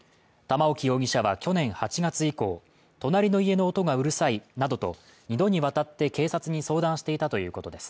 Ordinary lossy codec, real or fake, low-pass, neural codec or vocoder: none; real; none; none